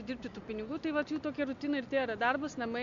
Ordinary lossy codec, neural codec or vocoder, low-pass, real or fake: AAC, 64 kbps; none; 7.2 kHz; real